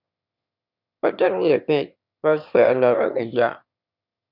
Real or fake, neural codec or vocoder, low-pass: fake; autoencoder, 22.05 kHz, a latent of 192 numbers a frame, VITS, trained on one speaker; 5.4 kHz